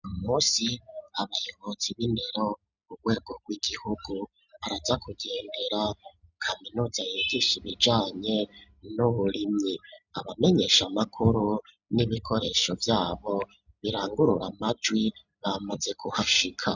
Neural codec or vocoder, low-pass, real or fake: none; 7.2 kHz; real